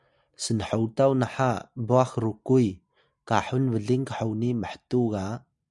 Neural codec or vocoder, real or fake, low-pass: none; real; 10.8 kHz